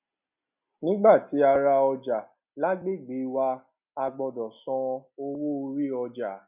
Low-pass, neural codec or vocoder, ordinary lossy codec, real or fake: 3.6 kHz; none; none; real